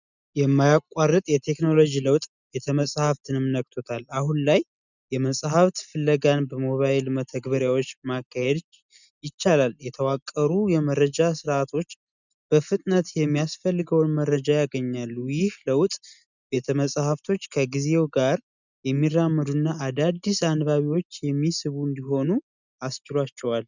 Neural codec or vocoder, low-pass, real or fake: none; 7.2 kHz; real